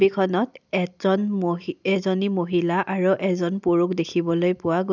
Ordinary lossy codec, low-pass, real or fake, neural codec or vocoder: none; 7.2 kHz; real; none